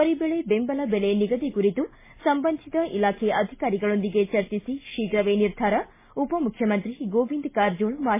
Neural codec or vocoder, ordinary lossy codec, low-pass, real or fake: none; MP3, 16 kbps; 3.6 kHz; real